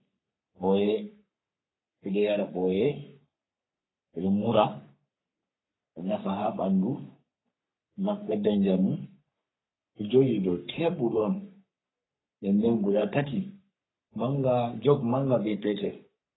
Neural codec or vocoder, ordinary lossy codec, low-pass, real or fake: codec, 44.1 kHz, 3.4 kbps, Pupu-Codec; AAC, 16 kbps; 7.2 kHz; fake